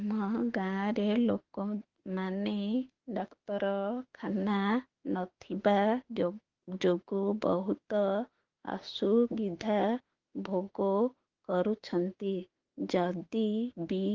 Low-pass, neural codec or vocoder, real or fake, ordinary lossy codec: 7.2 kHz; autoencoder, 48 kHz, 32 numbers a frame, DAC-VAE, trained on Japanese speech; fake; Opus, 16 kbps